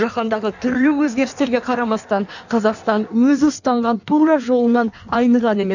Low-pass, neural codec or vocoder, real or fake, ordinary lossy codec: 7.2 kHz; codec, 16 kHz in and 24 kHz out, 1.1 kbps, FireRedTTS-2 codec; fake; none